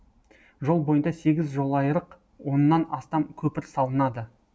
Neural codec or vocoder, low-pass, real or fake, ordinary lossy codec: none; none; real; none